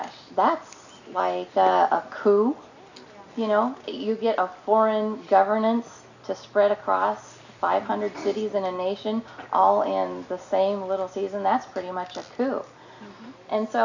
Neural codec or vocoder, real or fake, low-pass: none; real; 7.2 kHz